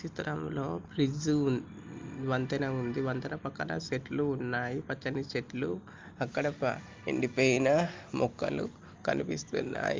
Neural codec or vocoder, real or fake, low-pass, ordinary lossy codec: none; real; 7.2 kHz; Opus, 32 kbps